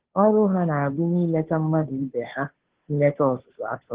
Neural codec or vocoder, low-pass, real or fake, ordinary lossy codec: codec, 16 kHz, 2 kbps, FunCodec, trained on Chinese and English, 25 frames a second; 3.6 kHz; fake; Opus, 16 kbps